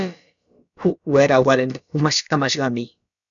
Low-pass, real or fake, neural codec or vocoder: 7.2 kHz; fake; codec, 16 kHz, about 1 kbps, DyCAST, with the encoder's durations